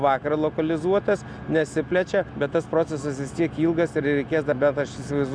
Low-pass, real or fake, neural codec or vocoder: 9.9 kHz; real; none